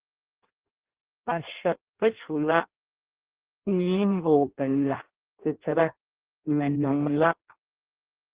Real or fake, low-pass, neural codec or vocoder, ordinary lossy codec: fake; 3.6 kHz; codec, 16 kHz in and 24 kHz out, 0.6 kbps, FireRedTTS-2 codec; Opus, 16 kbps